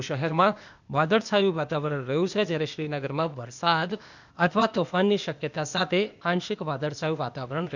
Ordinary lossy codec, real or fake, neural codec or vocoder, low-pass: none; fake; codec, 16 kHz, 0.8 kbps, ZipCodec; 7.2 kHz